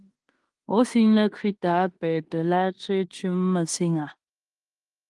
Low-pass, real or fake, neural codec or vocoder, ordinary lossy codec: 10.8 kHz; fake; codec, 16 kHz in and 24 kHz out, 0.9 kbps, LongCat-Audio-Codec, fine tuned four codebook decoder; Opus, 24 kbps